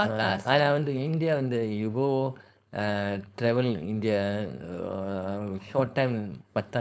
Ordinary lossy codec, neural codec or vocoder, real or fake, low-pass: none; codec, 16 kHz, 4.8 kbps, FACodec; fake; none